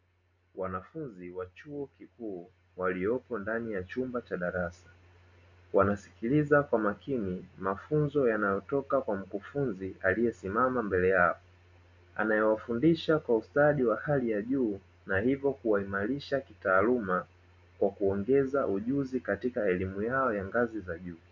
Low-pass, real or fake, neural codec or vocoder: 7.2 kHz; real; none